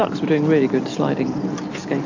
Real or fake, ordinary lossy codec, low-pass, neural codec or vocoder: real; AAC, 48 kbps; 7.2 kHz; none